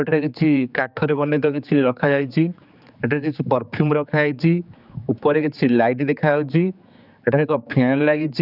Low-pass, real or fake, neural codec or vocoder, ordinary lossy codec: 5.4 kHz; fake; codec, 16 kHz, 4 kbps, X-Codec, HuBERT features, trained on general audio; none